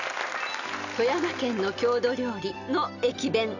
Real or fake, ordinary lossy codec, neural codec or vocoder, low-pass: real; none; none; 7.2 kHz